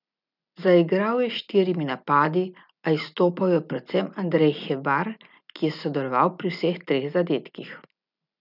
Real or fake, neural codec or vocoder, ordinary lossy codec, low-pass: real; none; none; 5.4 kHz